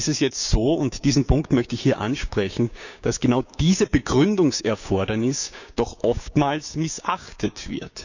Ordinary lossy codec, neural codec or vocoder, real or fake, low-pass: none; codec, 16 kHz, 6 kbps, DAC; fake; 7.2 kHz